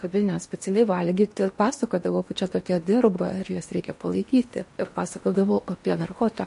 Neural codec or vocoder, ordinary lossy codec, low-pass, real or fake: codec, 16 kHz in and 24 kHz out, 0.8 kbps, FocalCodec, streaming, 65536 codes; MP3, 48 kbps; 10.8 kHz; fake